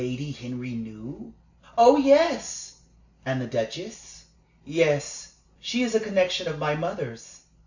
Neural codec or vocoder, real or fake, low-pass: none; real; 7.2 kHz